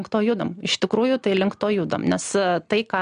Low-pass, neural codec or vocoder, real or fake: 9.9 kHz; none; real